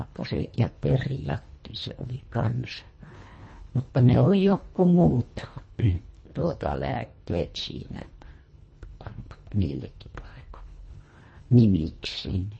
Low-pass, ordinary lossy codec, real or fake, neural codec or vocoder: 9.9 kHz; MP3, 32 kbps; fake; codec, 24 kHz, 1.5 kbps, HILCodec